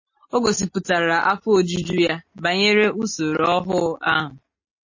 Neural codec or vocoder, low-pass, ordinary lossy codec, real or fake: none; 7.2 kHz; MP3, 32 kbps; real